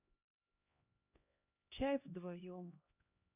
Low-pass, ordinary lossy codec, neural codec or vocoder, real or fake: 3.6 kHz; none; codec, 16 kHz, 1 kbps, X-Codec, HuBERT features, trained on LibriSpeech; fake